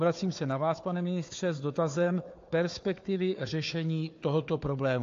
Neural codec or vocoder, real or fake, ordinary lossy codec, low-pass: codec, 16 kHz, 4 kbps, FunCodec, trained on Chinese and English, 50 frames a second; fake; AAC, 48 kbps; 7.2 kHz